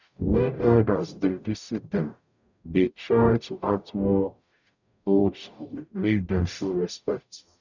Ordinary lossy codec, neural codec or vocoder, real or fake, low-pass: none; codec, 44.1 kHz, 0.9 kbps, DAC; fake; 7.2 kHz